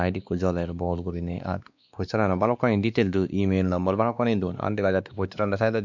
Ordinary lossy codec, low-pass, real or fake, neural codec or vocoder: none; 7.2 kHz; fake; codec, 16 kHz, 2 kbps, X-Codec, WavLM features, trained on Multilingual LibriSpeech